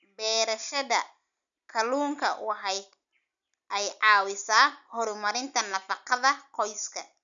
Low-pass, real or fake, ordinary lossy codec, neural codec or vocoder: 7.2 kHz; real; none; none